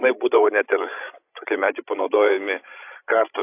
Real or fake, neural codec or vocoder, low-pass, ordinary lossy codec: fake; codec, 16 kHz, 16 kbps, FreqCodec, larger model; 3.6 kHz; AAC, 24 kbps